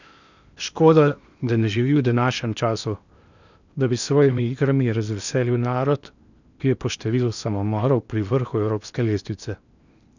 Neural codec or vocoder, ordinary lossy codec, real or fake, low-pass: codec, 16 kHz in and 24 kHz out, 0.8 kbps, FocalCodec, streaming, 65536 codes; none; fake; 7.2 kHz